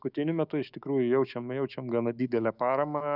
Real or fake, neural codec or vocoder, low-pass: fake; autoencoder, 48 kHz, 128 numbers a frame, DAC-VAE, trained on Japanese speech; 5.4 kHz